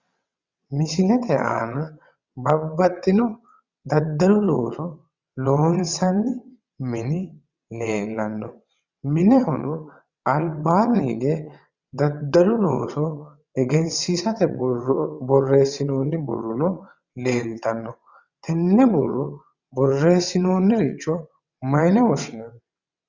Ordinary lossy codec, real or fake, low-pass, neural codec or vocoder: Opus, 64 kbps; fake; 7.2 kHz; vocoder, 22.05 kHz, 80 mel bands, WaveNeXt